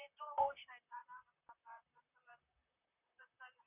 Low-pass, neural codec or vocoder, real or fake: 3.6 kHz; vocoder, 44.1 kHz, 128 mel bands, Pupu-Vocoder; fake